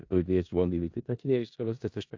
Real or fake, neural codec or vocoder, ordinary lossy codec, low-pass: fake; codec, 16 kHz in and 24 kHz out, 0.4 kbps, LongCat-Audio-Codec, four codebook decoder; AAC, 48 kbps; 7.2 kHz